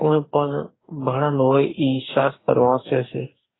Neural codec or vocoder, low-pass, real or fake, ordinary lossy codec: codec, 44.1 kHz, 2.6 kbps, DAC; 7.2 kHz; fake; AAC, 16 kbps